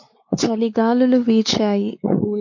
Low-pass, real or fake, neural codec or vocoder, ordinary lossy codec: 7.2 kHz; fake; codec, 16 kHz, 4 kbps, X-Codec, WavLM features, trained on Multilingual LibriSpeech; MP3, 48 kbps